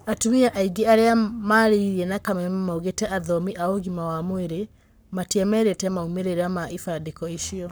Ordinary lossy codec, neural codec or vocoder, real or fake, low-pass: none; codec, 44.1 kHz, 7.8 kbps, Pupu-Codec; fake; none